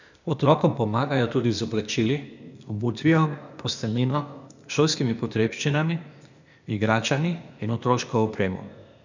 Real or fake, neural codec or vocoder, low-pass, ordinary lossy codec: fake; codec, 16 kHz, 0.8 kbps, ZipCodec; 7.2 kHz; none